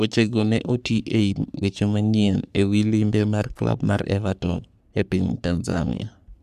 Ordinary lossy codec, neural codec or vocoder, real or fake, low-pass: none; codec, 44.1 kHz, 3.4 kbps, Pupu-Codec; fake; 14.4 kHz